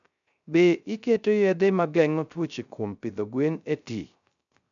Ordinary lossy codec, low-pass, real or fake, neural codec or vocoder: none; 7.2 kHz; fake; codec, 16 kHz, 0.3 kbps, FocalCodec